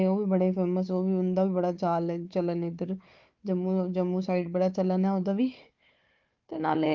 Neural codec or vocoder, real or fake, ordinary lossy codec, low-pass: codec, 16 kHz, 4 kbps, FunCodec, trained on Chinese and English, 50 frames a second; fake; Opus, 32 kbps; 7.2 kHz